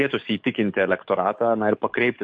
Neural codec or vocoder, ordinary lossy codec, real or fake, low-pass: codec, 44.1 kHz, 7.8 kbps, DAC; MP3, 48 kbps; fake; 9.9 kHz